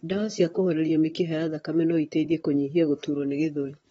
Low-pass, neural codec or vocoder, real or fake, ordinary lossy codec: 19.8 kHz; vocoder, 44.1 kHz, 128 mel bands, Pupu-Vocoder; fake; AAC, 24 kbps